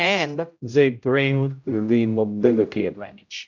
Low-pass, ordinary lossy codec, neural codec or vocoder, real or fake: 7.2 kHz; AAC, 48 kbps; codec, 16 kHz, 0.5 kbps, X-Codec, HuBERT features, trained on general audio; fake